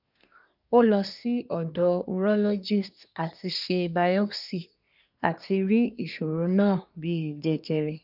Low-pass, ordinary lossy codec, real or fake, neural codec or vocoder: 5.4 kHz; none; fake; codec, 24 kHz, 1 kbps, SNAC